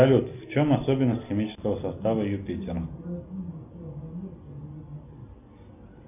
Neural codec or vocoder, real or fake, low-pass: none; real; 3.6 kHz